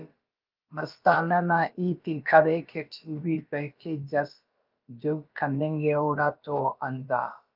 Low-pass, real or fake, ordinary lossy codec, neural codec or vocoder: 5.4 kHz; fake; Opus, 24 kbps; codec, 16 kHz, about 1 kbps, DyCAST, with the encoder's durations